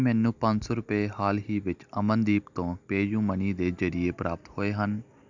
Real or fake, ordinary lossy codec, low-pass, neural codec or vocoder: real; Opus, 64 kbps; 7.2 kHz; none